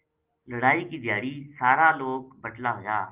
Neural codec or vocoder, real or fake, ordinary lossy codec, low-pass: none; real; Opus, 32 kbps; 3.6 kHz